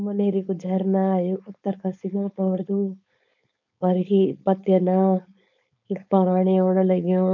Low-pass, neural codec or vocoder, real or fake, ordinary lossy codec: 7.2 kHz; codec, 16 kHz, 4.8 kbps, FACodec; fake; none